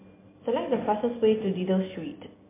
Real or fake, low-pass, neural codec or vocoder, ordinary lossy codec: real; 3.6 kHz; none; MP3, 24 kbps